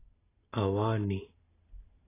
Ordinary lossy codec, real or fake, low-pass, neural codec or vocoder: AAC, 16 kbps; real; 3.6 kHz; none